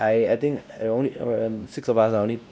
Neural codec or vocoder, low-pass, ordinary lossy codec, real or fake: codec, 16 kHz, 1 kbps, X-Codec, WavLM features, trained on Multilingual LibriSpeech; none; none; fake